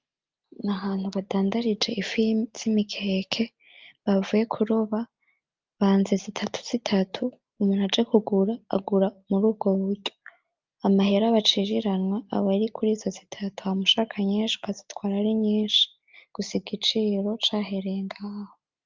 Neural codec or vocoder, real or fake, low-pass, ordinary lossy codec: none; real; 7.2 kHz; Opus, 24 kbps